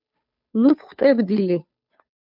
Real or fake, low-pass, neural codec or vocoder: fake; 5.4 kHz; codec, 16 kHz, 2 kbps, FunCodec, trained on Chinese and English, 25 frames a second